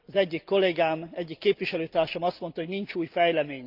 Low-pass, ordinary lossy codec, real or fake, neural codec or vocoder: 5.4 kHz; Opus, 24 kbps; real; none